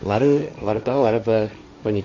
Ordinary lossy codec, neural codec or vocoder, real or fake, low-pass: none; codec, 16 kHz, 1.1 kbps, Voila-Tokenizer; fake; 7.2 kHz